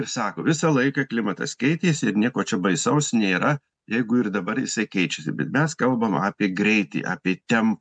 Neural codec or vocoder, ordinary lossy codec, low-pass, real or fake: none; MP3, 96 kbps; 9.9 kHz; real